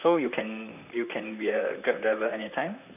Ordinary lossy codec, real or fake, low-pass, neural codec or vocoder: none; fake; 3.6 kHz; vocoder, 44.1 kHz, 128 mel bands, Pupu-Vocoder